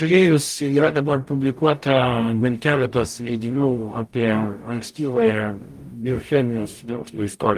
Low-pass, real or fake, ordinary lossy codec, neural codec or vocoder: 14.4 kHz; fake; Opus, 32 kbps; codec, 44.1 kHz, 0.9 kbps, DAC